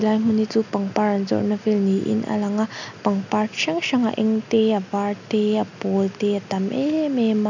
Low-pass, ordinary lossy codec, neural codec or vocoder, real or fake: 7.2 kHz; none; none; real